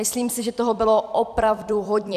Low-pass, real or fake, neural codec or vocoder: 14.4 kHz; fake; vocoder, 44.1 kHz, 128 mel bands every 256 samples, BigVGAN v2